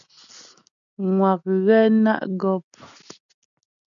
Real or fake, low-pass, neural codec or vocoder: real; 7.2 kHz; none